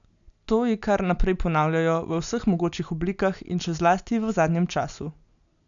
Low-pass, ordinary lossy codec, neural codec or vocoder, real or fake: 7.2 kHz; none; none; real